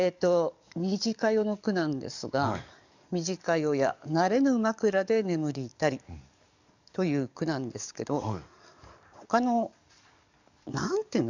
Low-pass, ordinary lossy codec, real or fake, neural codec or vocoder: 7.2 kHz; none; fake; codec, 44.1 kHz, 7.8 kbps, DAC